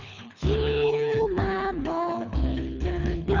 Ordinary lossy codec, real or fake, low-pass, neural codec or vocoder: none; fake; 7.2 kHz; codec, 24 kHz, 3 kbps, HILCodec